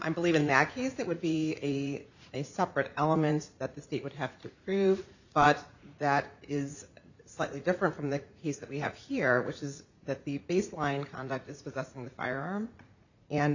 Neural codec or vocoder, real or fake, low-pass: vocoder, 44.1 kHz, 80 mel bands, Vocos; fake; 7.2 kHz